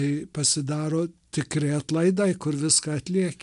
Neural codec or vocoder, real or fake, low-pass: none; real; 10.8 kHz